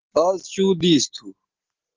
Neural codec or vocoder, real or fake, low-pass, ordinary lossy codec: none; real; 7.2 kHz; Opus, 16 kbps